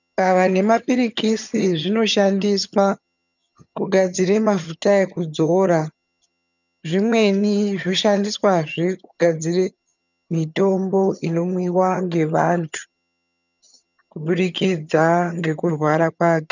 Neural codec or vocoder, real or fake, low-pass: vocoder, 22.05 kHz, 80 mel bands, HiFi-GAN; fake; 7.2 kHz